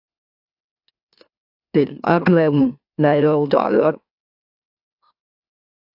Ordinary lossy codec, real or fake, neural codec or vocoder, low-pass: AAC, 48 kbps; fake; autoencoder, 44.1 kHz, a latent of 192 numbers a frame, MeloTTS; 5.4 kHz